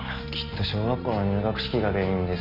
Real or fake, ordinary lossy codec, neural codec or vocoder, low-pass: real; none; none; 5.4 kHz